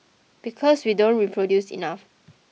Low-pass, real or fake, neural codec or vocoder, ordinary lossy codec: none; real; none; none